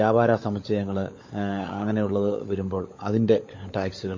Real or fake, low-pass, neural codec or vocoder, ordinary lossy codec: fake; 7.2 kHz; codec, 16 kHz, 16 kbps, FunCodec, trained on LibriTTS, 50 frames a second; MP3, 32 kbps